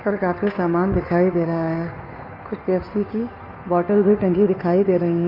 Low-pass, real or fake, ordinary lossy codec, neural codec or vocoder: 5.4 kHz; fake; none; codec, 16 kHz, 2 kbps, FunCodec, trained on Chinese and English, 25 frames a second